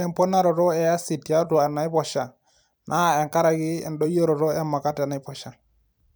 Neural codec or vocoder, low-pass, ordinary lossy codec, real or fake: vocoder, 44.1 kHz, 128 mel bands every 256 samples, BigVGAN v2; none; none; fake